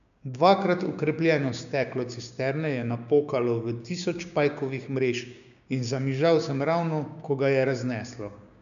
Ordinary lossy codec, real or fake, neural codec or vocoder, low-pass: none; fake; codec, 16 kHz, 6 kbps, DAC; 7.2 kHz